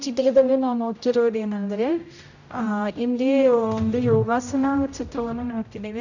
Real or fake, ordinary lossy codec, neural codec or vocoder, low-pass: fake; none; codec, 16 kHz, 0.5 kbps, X-Codec, HuBERT features, trained on general audio; 7.2 kHz